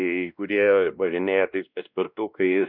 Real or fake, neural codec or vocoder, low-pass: fake; codec, 16 kHz, 1 kbps, X-Codec, WavLM features, trained on Multilingual LibriSpeech; 5.4 kHz